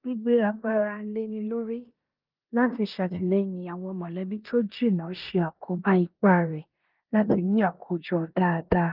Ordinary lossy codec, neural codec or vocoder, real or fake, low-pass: Opus, 32 kbps; codec, 16 kHz in and 24 kHz out, 0.9 kbps, LongCat-Audio-Codec, four codebook decoder; fake; 5.4 kHz